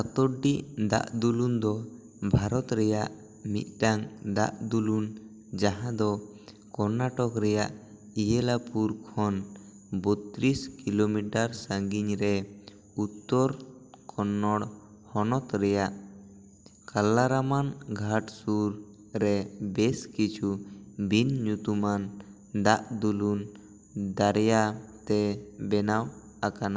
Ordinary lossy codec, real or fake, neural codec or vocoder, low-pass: none; real; none; none